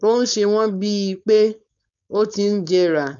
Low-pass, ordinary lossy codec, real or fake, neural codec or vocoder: 7.2 kHz; none; fake; codec, 16 kHz, 4.8 kbps, FACodec